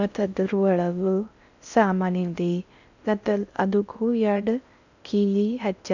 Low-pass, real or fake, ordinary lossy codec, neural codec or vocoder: 7.2 kHz; fake; none; codec, 16 kHz in and 24 kHz out, 0.6 kbps, FocalCodec, streaming, 4096 codes